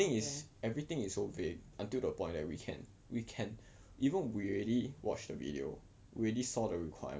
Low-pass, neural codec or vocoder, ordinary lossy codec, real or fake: none; none; none; real